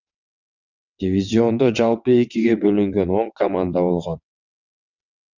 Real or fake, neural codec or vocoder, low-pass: fake; vocoder, 22.05 kHz, 80 mel bands, WaveNeXt; 7.2 kHz